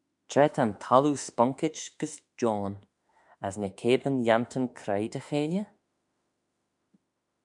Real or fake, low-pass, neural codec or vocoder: fake; 10.8 kHz; autoencoder, 48 kHz, 32 numbers a frame, DAC-VAE, trained on Japanese speech